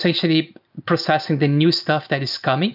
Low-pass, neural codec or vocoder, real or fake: 5.4 kHz; none; real